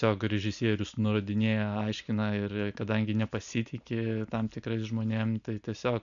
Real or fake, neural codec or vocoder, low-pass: real; none; 7.2 kHz